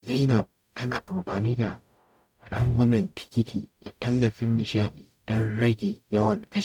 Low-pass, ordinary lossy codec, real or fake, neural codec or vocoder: 19.8 kHz; none; fake; codec, 44.1 kHz, 0.9 kbps, DAC